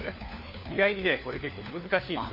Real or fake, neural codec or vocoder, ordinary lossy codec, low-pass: fake; codec, 16 kHz, 4 kbps, FunCodec, trained on LibriTTS, 50 frames a second; MP3, 24 kbps; 5.4 kHz